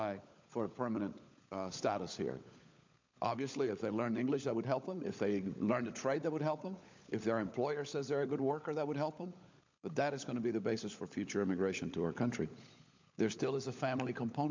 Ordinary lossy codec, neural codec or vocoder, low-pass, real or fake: MP3, 64 kbps; codec, 16 kHz, 16 kbps, FunCodec, trained on LibriTTS, 50 frames a second; 7.2 kHz; fake